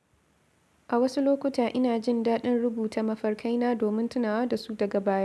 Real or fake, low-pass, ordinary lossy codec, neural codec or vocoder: real; none; none; none